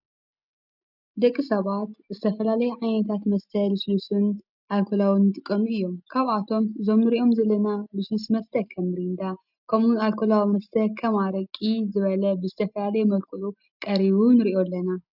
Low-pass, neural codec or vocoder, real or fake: 5.4 kHz; none; real